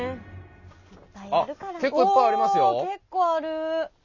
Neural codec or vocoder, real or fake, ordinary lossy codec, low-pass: none; real; none; 7.2 kHz